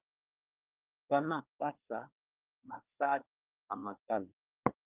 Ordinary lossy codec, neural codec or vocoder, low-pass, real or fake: Opus, 24 kbps; codec, 24 kHz, 1 kbps, SNAC; 3.6 kHz; fake